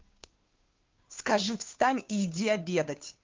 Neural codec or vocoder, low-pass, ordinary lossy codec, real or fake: codec, 16 kHz, 2 kbps, FunCodec, trained on Chinese and English, 25 frames a second; 7.2 kHz; Opus, 24 kbps; fake